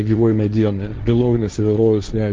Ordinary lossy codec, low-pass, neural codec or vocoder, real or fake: Opus, 32 kbps; 7.2 kHz; codec, 16 kHz, 1.1 kbps, Voila-Tokenizer; fake